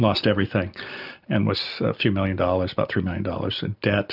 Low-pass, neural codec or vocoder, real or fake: 5.4 kHz; none; real